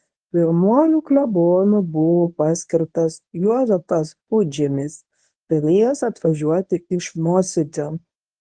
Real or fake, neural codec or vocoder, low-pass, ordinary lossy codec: fake; codec, 24 kHz, 0.9 kbps, WavTokenizer, medium speech release version 1; 9.9 kHz; Opus, 24 kbps